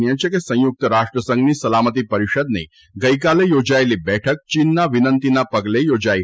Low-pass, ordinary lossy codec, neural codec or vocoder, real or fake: none; none; none; real